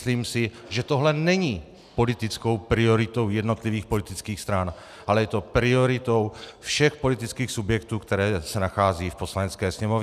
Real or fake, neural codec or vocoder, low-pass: real; none; 14.4 kHz